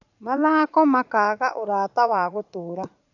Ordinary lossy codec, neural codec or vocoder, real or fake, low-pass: none; vocoder, 44.1 kHz, 80 mel bands, Vocos; fake; 7.2 kHz